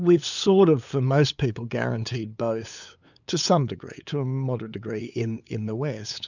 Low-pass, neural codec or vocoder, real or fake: 7.2 kHz; codec, 16 kHz, 8 kbps, FunCodec, trained on LibriTTS, 25 frames a second; fake